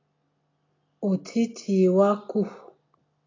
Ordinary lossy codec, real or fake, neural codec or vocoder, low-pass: AAC, 32 kbps; real; none; 7.2 kHz